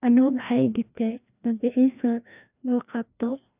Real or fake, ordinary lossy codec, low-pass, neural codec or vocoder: fake; none; 3.6 kHz; codec, 16 kHz, 1 kbps, FreqCodec, larger model